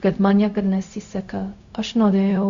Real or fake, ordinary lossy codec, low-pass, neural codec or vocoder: fake; Opus, 64 kbps; 7.2 kHz; codec, 16 kHz, 0.4 kbps, LongCat-Audio-Codec